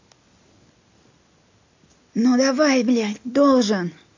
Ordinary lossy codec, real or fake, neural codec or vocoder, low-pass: AAC, 48 kbps; real; none; 7.2 kHz